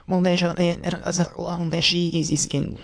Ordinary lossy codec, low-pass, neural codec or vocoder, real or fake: AAC, 64 kbps; 9.9 kHz; autoencoder, 22.05 kHz, a latent of 192 numbers a frame, VITS, trained on many speakers; fake